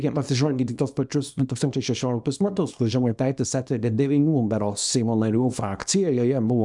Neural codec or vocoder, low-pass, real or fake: codec, 24 kHz, 0.9 kbps, WavTokenizer, small release; 10.8 kHz; fake